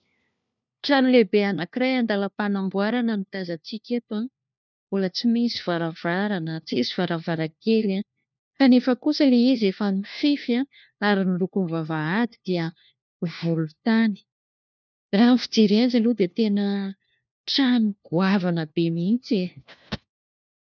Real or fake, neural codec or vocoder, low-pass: fake; codec, 16 kHz, 1 kbps, FunCodec, trained on LibriTTS, 50 frames a second; 7.2 kHz